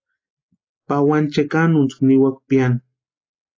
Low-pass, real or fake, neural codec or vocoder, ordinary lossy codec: 7.2 kHz; real; none; AAC, 32 kbps